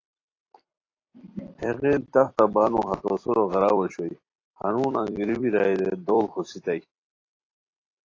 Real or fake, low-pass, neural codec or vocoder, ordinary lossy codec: fake; 7.2 kHz; vocoder, 44.1 kHz, 128 mel bands every 256 samples, BigVGAN v2; AAC, 32 kbps